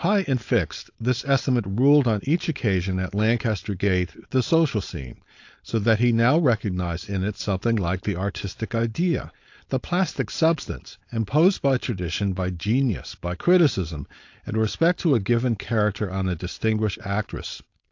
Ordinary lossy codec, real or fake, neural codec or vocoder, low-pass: AAC, 48 kbps; fake; codec, 16 kHz, 4.8 kbps, FACodec; 7.2 kHz